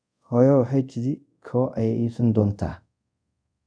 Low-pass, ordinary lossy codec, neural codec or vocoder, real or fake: 9.9 kHz; none; codec, 24 kHz, 0.5 kbps, DualCodec; fake